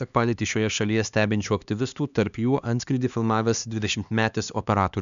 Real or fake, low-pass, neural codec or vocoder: fake; 7.2 kHz; codec, 16 kHz, 2 kbps, X-Codec, HuBERT features, trained on LibriSpeech